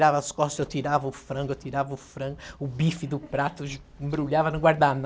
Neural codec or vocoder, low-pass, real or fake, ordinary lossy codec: none; none; real; none